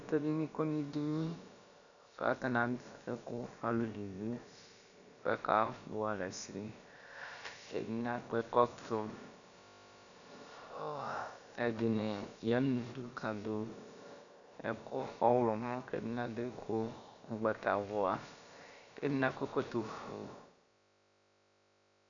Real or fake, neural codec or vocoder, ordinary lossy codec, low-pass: fake; codec, 16 kHz, about 1 kbps, DyCAST, with the encoder's durations; MP3, 96 kbps; 7.2 kHz